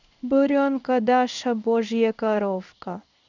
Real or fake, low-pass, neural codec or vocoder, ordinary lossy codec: fake; 7.2 kHz; codec, 16 kHz in and 24 kHz out, 1 kbps, XY-Tokenizer; none